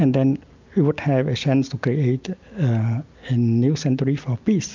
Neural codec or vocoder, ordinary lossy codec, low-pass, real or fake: none; MP3, 64 kbps; 7.2 kHz; real